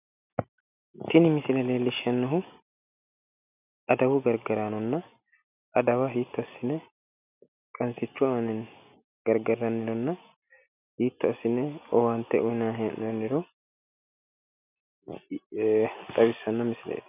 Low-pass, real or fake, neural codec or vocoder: 3.6 kHz; real; none